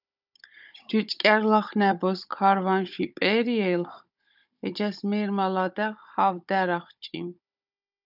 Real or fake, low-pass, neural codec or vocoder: fake; 5.4 kHz; codec, 16 kHz, 16 kbps, FunCodec, trained on Chinese and English, 50 frames a second